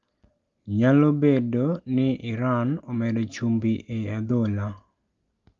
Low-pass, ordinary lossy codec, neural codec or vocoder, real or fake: 7.2 kHz; Opus, 32 kbps; none; real